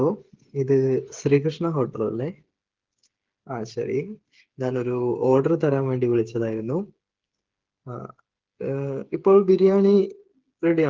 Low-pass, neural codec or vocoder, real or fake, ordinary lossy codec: 7.2 kHz; codec, 16 kHz, 8 kbps, FreqCodec, smaller model; fake; Opus, 16 kbps